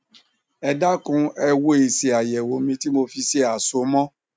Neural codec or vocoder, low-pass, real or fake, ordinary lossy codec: none; none; real; none